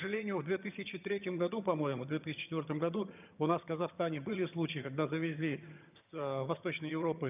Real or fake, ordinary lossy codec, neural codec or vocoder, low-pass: fake; none; vocoder, 22.05 kHz, 80 mel bands, HiFi-GAN; 3.6 kHz